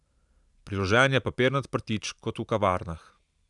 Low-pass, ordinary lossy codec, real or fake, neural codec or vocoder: 10.8 kHz; none; fake; vocoder, 44.1 kHz, 128 mel bands every 512 samples, BigVGAN v2